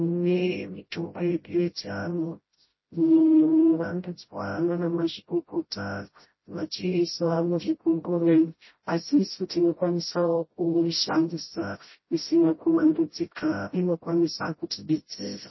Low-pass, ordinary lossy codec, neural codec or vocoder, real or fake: 7.2 kHz; MP3, 24 kbps; codec, 16 kHz, 0.5 kbps, FreqCodec, smaller model; fake